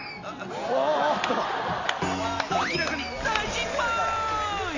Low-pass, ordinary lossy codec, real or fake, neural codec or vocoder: 7.2 kHz; AAC, 48 kbps; real; none